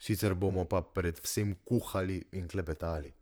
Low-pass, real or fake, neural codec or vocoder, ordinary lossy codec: none; fake; vocoder, 44.1 kHz, 128 mel bands, Pupu-Vocoder; none